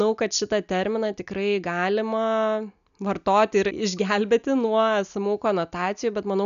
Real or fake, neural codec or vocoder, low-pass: real; none; 7.2 kHz